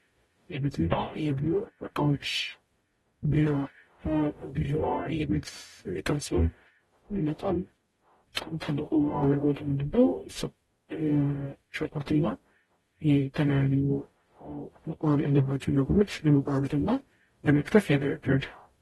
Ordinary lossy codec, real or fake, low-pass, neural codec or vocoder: AAC, 32 kbps; fake; 19.8 kHz; codec, 44.1 kHz, 0.9 kbps, DAC